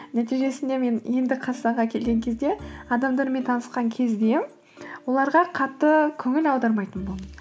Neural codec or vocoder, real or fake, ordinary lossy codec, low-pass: none; real; none; none